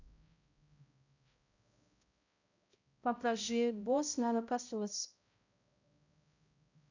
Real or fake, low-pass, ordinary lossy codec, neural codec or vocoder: fake; 7.2 kHz; none; codec, 16 kHz, 0.5 kbps, X-Codec, HuBERT features, trained on balanced general audio